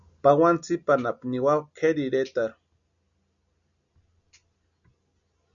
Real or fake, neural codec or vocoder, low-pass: real; none; 7.2 kHz